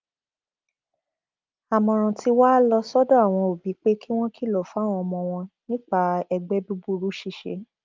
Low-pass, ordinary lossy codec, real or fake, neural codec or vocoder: 7.2 kHz; Opus, 24 kbps; real; none